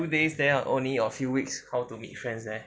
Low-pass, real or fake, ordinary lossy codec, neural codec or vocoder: none; real; none; none